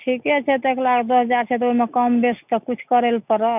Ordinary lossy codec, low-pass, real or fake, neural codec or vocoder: none; 3.6 kHz; real; none